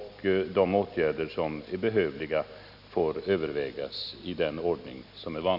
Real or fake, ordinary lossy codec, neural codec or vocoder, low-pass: real; none; none; 5.4 kHz